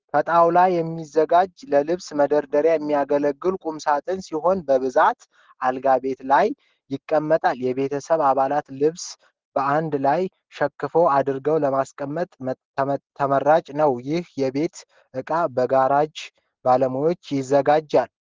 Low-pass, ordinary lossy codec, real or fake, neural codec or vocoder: 7.2 kHz; Opus, 16 kbps; real; none